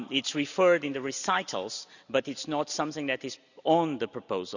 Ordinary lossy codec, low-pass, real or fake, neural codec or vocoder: none; 7.2 kHz; real; none